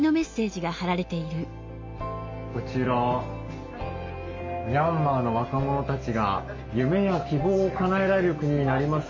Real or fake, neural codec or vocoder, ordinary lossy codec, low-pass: real; none; MP3, 48 kbps; 7.2 kHz